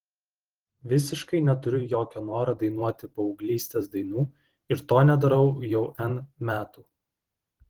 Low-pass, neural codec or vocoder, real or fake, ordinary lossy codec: 19.8 kHz; vocoder, 44.1 kHz, 128 mel bands every 512 samples, BigVGAN v2; fake; Opus, 16 kbps